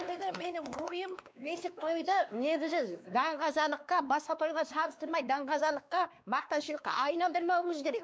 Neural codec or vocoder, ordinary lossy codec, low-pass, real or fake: codec, 16 kHz, 2 kbps, X-Codec, WavLM features, trained on Multilingual LibriSpeech; none; none; fake